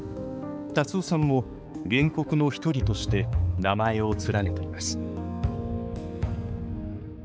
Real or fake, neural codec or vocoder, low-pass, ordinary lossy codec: fake; codec, 16 kHz, 4 kbps, X-Codec, HuBERT features, trained on balanced general audio; none; none